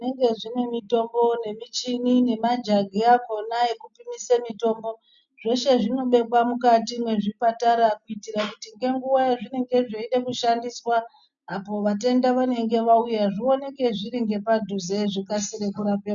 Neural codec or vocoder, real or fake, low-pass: none; real; 7.2 kHz